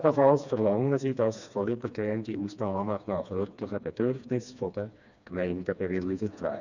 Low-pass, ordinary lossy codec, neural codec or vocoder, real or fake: 7.2 kHz; none; codec, 16 kHz, 2 kbps, FreqCodec, smaller model; fake